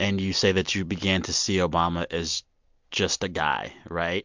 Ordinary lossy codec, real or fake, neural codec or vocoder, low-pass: MP3, 64 kbps; real; none; 7.2 kHz